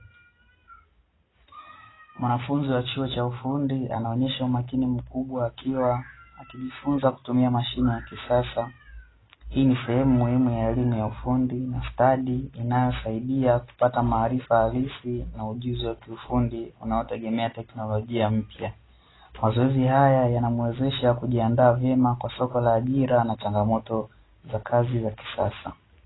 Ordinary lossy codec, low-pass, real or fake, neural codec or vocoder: AAC, 16 kbps; 7.2 kHz; real; none